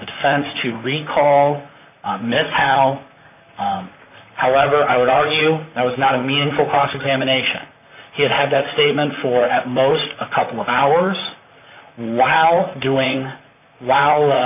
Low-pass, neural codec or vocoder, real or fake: 3.6 kHz; vocoder, 44.1 kHz, 128 mel bands, Pupu-Vocoder; fake